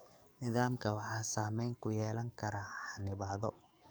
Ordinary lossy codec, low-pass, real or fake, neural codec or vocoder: none; none; fake; codec, 44.1 kHz, 7.8 kbps, DAC